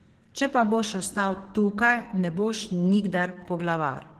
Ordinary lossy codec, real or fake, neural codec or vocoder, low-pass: Opus, 16 kbps; fake; codec, 44.1 kHz, 2.6 kbps, SNAC; 14.4 kHz